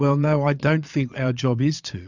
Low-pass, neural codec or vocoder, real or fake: 7.2 kHz; vocoder, 22.05 kHz, 80 mel bands, Vocos; fake